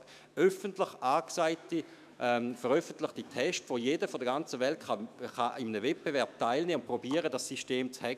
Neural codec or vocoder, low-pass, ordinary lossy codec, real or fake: autoencoder, 48 kHz, 128 numbers a frame, DAC-VAE, trained on Japanese speech; 14.4 kHz; none; fake